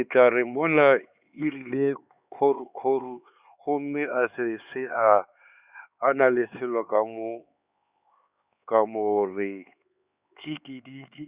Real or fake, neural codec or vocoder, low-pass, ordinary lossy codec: fake; codec, 16 kHz, 4 kbps, X-Codec, HuBERT features, trained on LibriSpeech; 3.6 kHz; Opus, 64 kbps